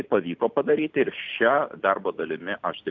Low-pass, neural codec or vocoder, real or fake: 7.2 kHz; none; real